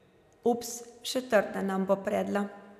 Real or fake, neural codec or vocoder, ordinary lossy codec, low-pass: real; none; none; 14.4 kHz